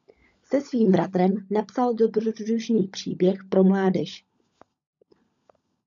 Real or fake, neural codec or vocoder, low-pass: fake; codec, 16 kHz, 16 kbps, FunCodec, trained on LibriTTS, 50 frames a second; 7.2 kHz